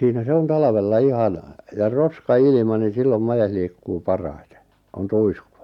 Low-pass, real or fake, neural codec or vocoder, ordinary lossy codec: 19.8 kHz; real; none; none